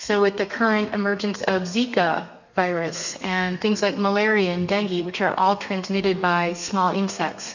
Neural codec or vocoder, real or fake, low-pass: codec, 32 kHz, 1.9 kbps, SNAC; fake; 7.2 kHz